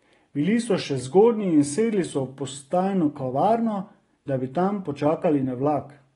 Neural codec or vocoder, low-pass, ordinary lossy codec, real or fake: none; 10.8 kHz; AAC, 32 kbps; real